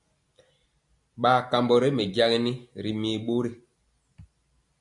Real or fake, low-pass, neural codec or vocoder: real; 10.8 kHz; none